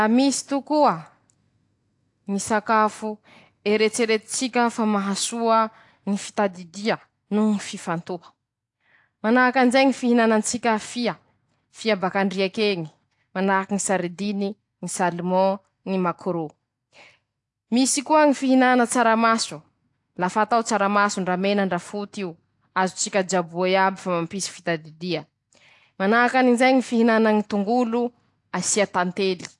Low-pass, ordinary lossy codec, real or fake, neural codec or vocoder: 10.8 kHz; AAC, 64 kbps; real; none